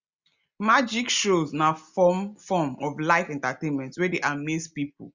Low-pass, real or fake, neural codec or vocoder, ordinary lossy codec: 7.2 kHz; real; none; Opus, 64 kbps